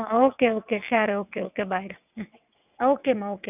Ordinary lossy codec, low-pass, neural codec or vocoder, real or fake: none; 3.6 kHz; vocoder, 22.05 kHz, 80 mel bands, WaveNeXt; fake